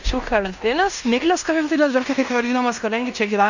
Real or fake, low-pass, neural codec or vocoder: fake; 7.2 kHz; codec, 16 kHz in and 24 kHz out, 0.9 kbps, LongCat-Audio-Codec, fine tuned four codebook decoder